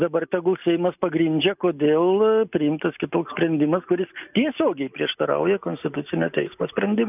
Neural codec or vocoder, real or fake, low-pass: none; real; 3.6 kHz